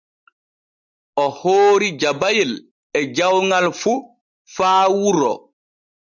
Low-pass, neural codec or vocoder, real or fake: 7.2 kHz; none; real